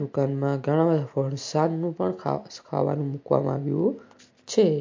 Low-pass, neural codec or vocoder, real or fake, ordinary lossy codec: 7.2 kHz; none; real; MP3, 48 kbps